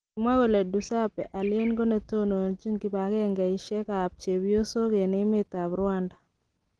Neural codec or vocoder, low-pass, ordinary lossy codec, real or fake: none; 9.9 kHz; Opus, 16 kbps; real